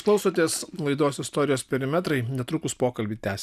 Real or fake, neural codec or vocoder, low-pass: real; none; 14.4 kHz